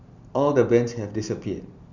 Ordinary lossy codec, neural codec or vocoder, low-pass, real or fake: none; none; 7.2 kHz; real